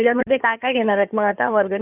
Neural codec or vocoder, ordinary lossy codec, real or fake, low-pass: codec, 16 kHz in and 24 kHz out, 2.2 kbps, FireRedTTS-2 codec; none; fake; 3.6 kHz